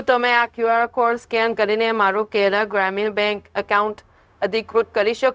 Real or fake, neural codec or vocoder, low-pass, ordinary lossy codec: fake; codec, 16 kHz, 0.4 kbps, LongCat-Audio-Codec; none; none